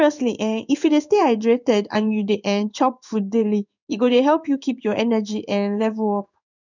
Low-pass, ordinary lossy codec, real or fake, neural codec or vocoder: 7.2 kHz; none; fake; codec, 16 kHz in and 24 kHz out, 1 kbps, XY-Tokenizer